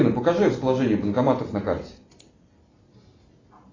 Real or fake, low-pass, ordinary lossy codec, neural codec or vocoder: real; 7.2 kHz; AAC, 32 kbps; none